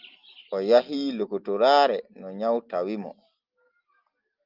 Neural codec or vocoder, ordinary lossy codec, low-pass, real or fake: none; Opus, 24 kbps; 5.4 kHz; real